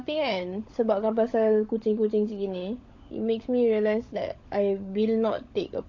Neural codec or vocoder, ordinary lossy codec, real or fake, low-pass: codec, 16 kHz, 16 kbps, FunCodec, trained on LibriTTS, 50 frames a second; AAC, 48 kbps; fake; 7.2 kHz